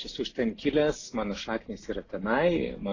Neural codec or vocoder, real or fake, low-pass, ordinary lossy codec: none; real; 7.2 kHz; AAC, 32 kbps